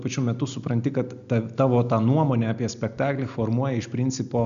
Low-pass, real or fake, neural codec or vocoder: 7.2 kHz; real; none